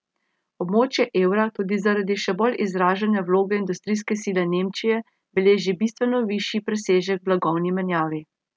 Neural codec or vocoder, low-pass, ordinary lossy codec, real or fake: none; none; none; real